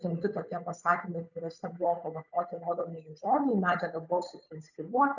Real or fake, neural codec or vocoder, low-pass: fake; codec, 16 kHz, 8 kbps, FunCodec, trained on Chinese and English, 25 frames a second; 7.2 kHz